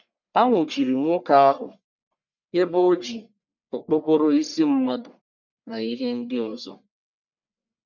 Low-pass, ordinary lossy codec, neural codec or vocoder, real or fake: 7.2 kHz; none; codec, 44.1 kHz, 1.7 kbps, Pupu-Codec; fake